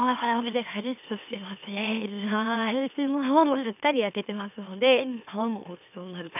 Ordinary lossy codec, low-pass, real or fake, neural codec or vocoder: none; 3.6 kHz; fake; autoencoder, 44.1 kHz, a latent of 192 numbers a frame, MeloTTS